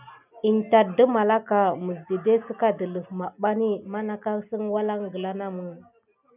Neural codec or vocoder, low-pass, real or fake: none; 3.6 kHz; real